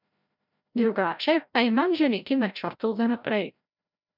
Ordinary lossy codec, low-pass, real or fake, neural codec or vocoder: none; 5.4 kHz; fake; codec, 16 kHz, 0.5 kbps, FreqCodec, larger model